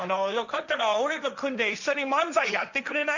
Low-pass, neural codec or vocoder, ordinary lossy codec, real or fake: 7.2 kHz; codec, 16 kHz, 1.1 kbps, Voila-Tokenizer; none; fake